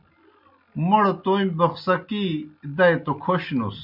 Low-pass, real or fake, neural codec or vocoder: 5.4 kHz; real; none